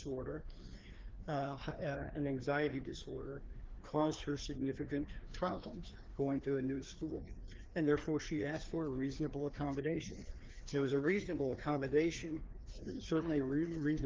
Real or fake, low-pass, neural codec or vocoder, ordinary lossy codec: fake; 7.2 kHz; codec, 16 kHz, 2 kbps, FreqCodec, larger model; Opus, 16 kbps